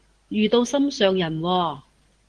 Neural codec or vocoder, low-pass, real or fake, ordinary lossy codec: none; 9.9 kHz; real; Opus, 16 kbps